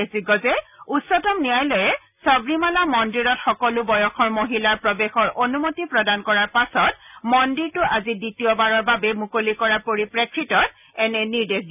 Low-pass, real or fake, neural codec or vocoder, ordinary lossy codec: 3.6 kHz; real; none; none